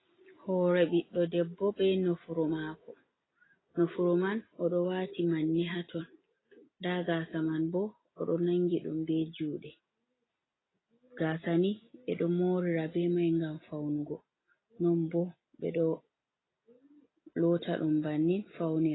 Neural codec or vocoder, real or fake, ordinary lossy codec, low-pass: none; real; AAC, 16 kbps; 7.2 kHz